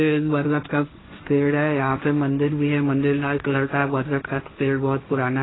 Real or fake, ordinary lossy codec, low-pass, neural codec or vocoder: fake; AAC, 16 kbps; 7.2 kHz; codec, 16 kHz, 1.1 kbps, Voila-Tokenizer